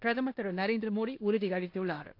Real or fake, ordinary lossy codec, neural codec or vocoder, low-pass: fake; AAC, 32 kbps; codec, 16 kHz in and 24 kHz out, 0.9 kbps, LongCat-Audio-Codec, four codebook decoder; 5.4 kHz